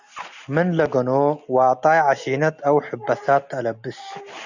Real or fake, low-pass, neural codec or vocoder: real; 7.2 kHz; none